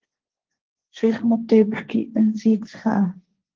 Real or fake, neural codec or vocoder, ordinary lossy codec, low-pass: fake; codec, 16 kHz, 1.1 kbps, Voila-Tokenizer; Opus, 16 kbps; 7.2 kHz